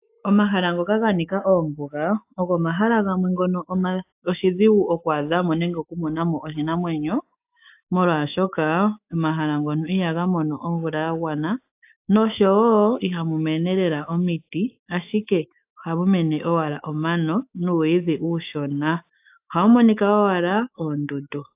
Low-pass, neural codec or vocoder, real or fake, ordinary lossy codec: 3.6 kHz; autoencoder, 48 kHz, 128 numbers a frame, DAC-VAE, trained on Japanese speech; fake; AAC, 32 kbps